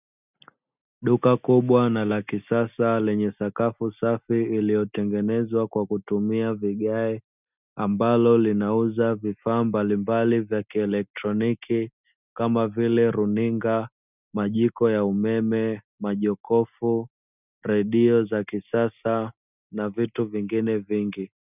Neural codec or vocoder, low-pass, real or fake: none; 3.6 kHz; real